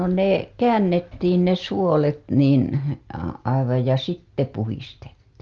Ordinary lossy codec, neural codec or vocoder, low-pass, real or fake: Opus, 32 kbps; none; 7.2 kHz; real